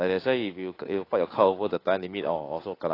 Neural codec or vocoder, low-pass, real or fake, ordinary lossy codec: codec, 16 kHz, 8 kbps, FunCodec, trained on Chinese and English, 25 frames a second; 5.4 kHz; fake; AAC, 24 kbps